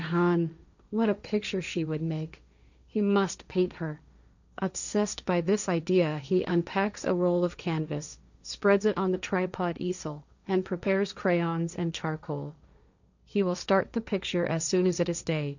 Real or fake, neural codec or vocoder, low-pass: fake; codec, 16 kHz, 1.1 kbps, Voila-Tokenizer; 7.2 kHz